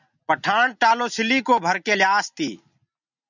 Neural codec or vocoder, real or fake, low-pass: none; real; 7.2 kHz